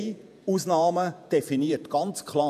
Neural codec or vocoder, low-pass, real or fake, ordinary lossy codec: vocoder, 48 kHz, 128 mel bands, Vocos; 14.4 kHz; fake; none